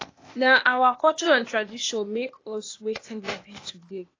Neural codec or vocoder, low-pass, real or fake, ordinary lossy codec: codec, 16 kHz, 0.8 kbps, ZipCodec; 7.2 kHz; fake; AAC, 32 kbps